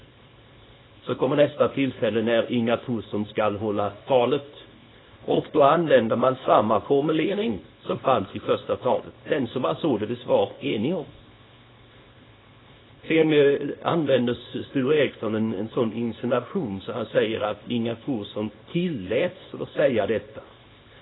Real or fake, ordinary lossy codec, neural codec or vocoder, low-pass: fake; AAC, 16 kbps; codec, 24 kHz, 0.9 kbps, WavTokenizer, small release; 7.2 kHz